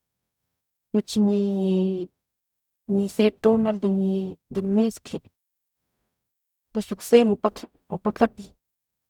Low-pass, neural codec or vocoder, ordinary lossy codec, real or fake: 19.8 kHz; codec, 44.1 kHz, 0.9 kbps, DAC; none; fake